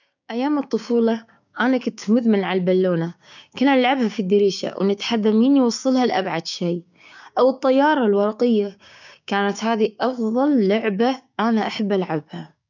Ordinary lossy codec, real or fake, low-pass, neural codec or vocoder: none; fake; 7.2 kHz; codec, 16 kHz, 6 kbps, DAC